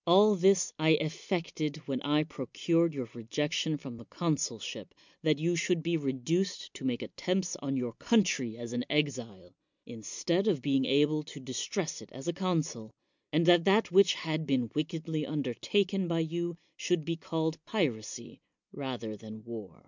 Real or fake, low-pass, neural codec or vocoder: real; 7.2 kHz; none